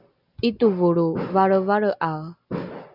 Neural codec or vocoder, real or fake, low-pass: none; real; 5.4 kHz